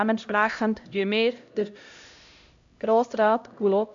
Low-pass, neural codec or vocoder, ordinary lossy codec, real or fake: 7.2 kHz; codec, 16 kHz, 0.5 kbps, X-Codec, HuBERT features, trained on LibriSpeech; AAC, 64 kbps; fake